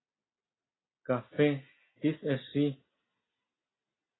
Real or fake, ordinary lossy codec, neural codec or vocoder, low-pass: real; AAC, 16 kbps; none; 7.2 kHz